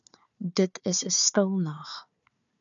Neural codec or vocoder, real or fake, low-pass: codec, 16 kHz, 4 kbps, FunCodec, trained on Chinese and English, 50 frames a second; fake; 7.2 kHz